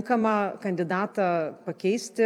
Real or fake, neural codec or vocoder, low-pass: fake; vocoder, 44.1 kHz, 128 mel bands every 256 samples, BigVGAN v2; 19.8 kHz